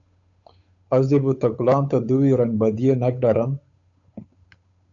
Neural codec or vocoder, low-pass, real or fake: codec, 16 kHz, 8 kbps, FunCodec, trained on Chinese and English, 25 frames a second; 7.2 kHz; fake